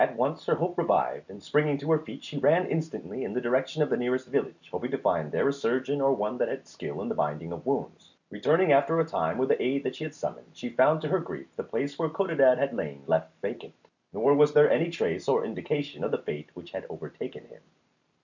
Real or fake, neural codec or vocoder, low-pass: real; none; 7.2 kHz